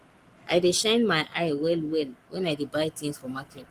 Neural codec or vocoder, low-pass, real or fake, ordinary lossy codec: codec, 44.1 kHz, 7.8 kbps, Pupu-Codec; 14.4 kHz; fake; Opus, 32 kbps